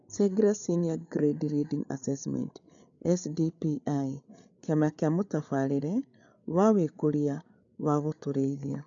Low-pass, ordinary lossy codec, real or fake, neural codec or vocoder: 7.2 kHz; none; fake; codec, 16 kHz, 8 kbps, FreqCodec, larger model